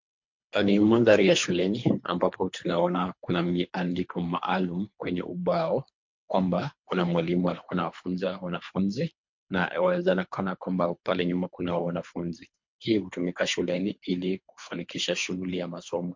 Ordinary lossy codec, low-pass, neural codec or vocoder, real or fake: MP3, 48 kbps; 7.2 kHz; codec, 24 kHz, 3 kbps, HILCodec; fake